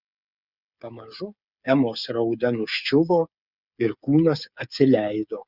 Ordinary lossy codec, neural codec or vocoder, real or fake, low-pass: Opus, 64 kbps; codec, 16 kHz, 8 kbps, FreqCodec, smaller model; fake; 5.4 kHz